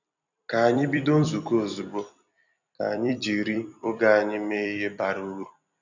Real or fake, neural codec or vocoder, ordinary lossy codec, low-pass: fake; vocoder, 44.1 kHz, 128 mel bands every 256 samples, BigVGAN v2; none; 7.2 kHz